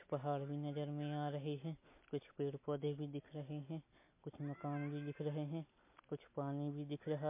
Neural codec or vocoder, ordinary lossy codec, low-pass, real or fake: none; MP3, 24 kbps; 3.6 kHz; real